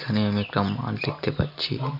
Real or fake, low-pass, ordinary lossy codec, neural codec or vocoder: real; 5.4 kHz; none; none